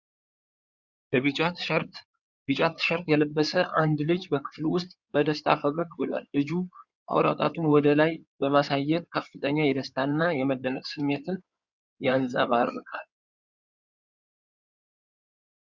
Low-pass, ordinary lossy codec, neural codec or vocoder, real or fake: 7.2 kHz; Opus, 64 kbps; codec, 16 kHz in and 24 kHz out, 2.2 kbps, FireRedTTS-2 codec; fake